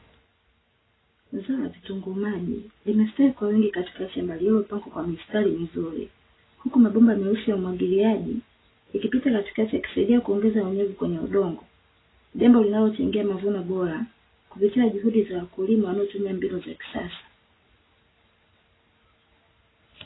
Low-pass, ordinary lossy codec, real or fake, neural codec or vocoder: 7.2 kHz; AAC, 16 kbps; real; none